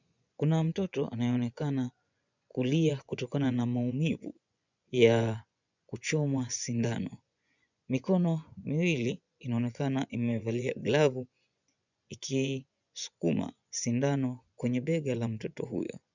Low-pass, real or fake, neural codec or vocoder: 7.2 kHz; fake; vocoder, 24 kHz, 100 mel bands, Vocos